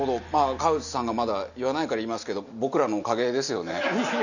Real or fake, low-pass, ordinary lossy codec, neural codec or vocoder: real; 7.2 kHz; none; none